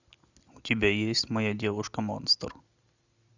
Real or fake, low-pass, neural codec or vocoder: real; 7.2 kHz; none